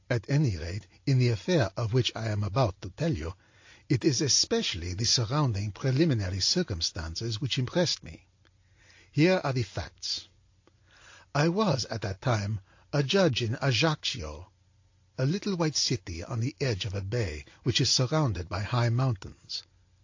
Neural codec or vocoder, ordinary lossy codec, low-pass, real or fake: none; AAC, 48 kbps; 7.2 kHz; real